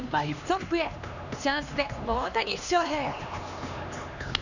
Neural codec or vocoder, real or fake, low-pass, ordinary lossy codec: codec, 16 kHz, 2 kbps, X-Codec, HuBERT features, trained on LibriSpeech; fake; 7.2 kHz; none